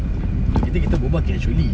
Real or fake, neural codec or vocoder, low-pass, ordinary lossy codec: real; none; none; none